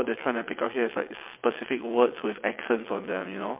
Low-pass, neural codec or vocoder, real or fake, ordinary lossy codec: 3.6 kHz; vocoder, 22.05 kHz, 80 mel bands, WaveNeXt; fake; MP3, 32 kbps